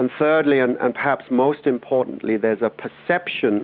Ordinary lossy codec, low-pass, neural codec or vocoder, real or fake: Opus, 64 kbps; 5.4 kHz; none; real